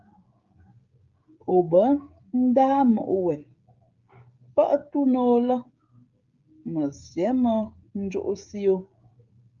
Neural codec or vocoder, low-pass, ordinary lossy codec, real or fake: codec, 16 kHz, 16 kbps, FreqCodec, smaller model; 7.2 kHz; Opus, 32 kbps; fake